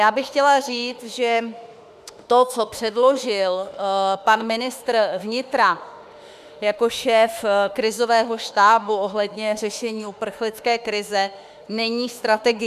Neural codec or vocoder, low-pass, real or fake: autoencoder, 48 kHz, 32 numbers a frame, DAC-VAE, trained on Japanese speech; 14.4 kHz; fake